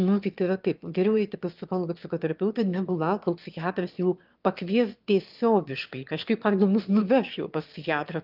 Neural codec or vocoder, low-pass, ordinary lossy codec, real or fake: autoencoder, 22.05 kHz, a latent of 192 numbers a frame, VITS, trained on one speaker; 5.4 kHz; Opus, 24 kbps; fake